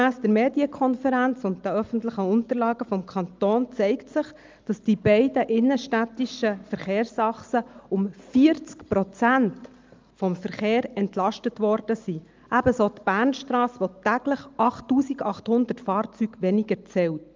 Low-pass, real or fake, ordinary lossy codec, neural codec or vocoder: 7.2 kHz; real; Opus, 24 kbps; none